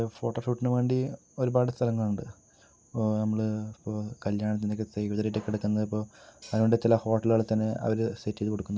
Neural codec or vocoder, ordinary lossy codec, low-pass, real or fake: none; none; none; real